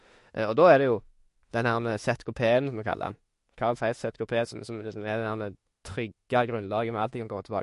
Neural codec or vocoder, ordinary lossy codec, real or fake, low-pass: autoencoder, 48 kHz, 32 numbers a frame, DAC-VAE, trained on Japanese speech; MP3, 48 kbps; fake; 14.4 kHz